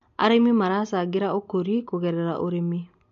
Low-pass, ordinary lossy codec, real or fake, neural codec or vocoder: 7.2 kHz; MP3, 48 kbps; real; none